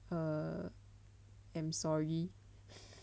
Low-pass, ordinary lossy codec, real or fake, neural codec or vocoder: none; none; real; none